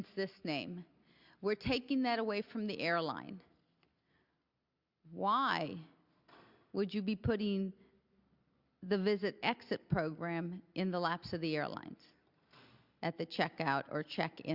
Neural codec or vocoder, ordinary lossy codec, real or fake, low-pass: none; Opus, 64 kbps; real; 5.4 kHz